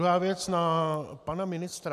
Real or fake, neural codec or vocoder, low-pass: real; none; 14.4 kHz